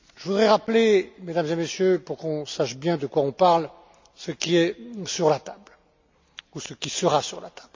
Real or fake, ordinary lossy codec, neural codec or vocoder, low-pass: real; MP3, 64 kbps; none; 7.2 kHz